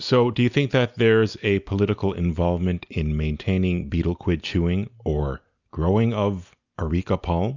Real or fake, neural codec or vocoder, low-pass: real; none; 7.2 kHz